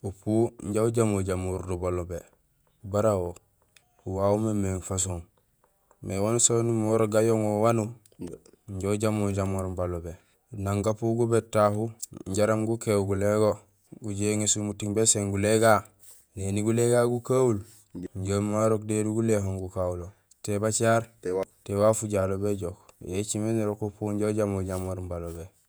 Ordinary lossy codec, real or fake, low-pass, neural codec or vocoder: none; fake; none; vocoder, 48 kHz, 128 mel bands, Vocos